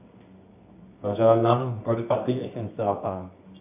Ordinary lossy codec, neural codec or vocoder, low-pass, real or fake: none; codec, 24 kHz, 0.9 kbps, WavTokenizer, medium music audio release; 3.6 kHz; fake